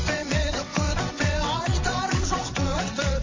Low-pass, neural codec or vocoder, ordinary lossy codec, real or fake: 7.2 kHz; vocoder, 22.05 kHz, 80 mel bands, WaveNeXt; MP3, 32 kbps; fake